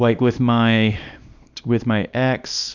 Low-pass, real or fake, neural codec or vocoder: 7.2 kHz; fake; codec, 24 kHz, 0.9 kbps, WavTokenizer, small release